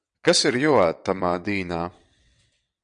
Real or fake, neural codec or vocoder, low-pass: fake; vocoder, 22.05 kHz, 80 mel bands, WaveNeXt; 9.9 kHz